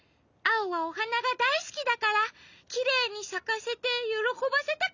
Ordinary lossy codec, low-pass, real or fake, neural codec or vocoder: none; 7.2 kHz; real; none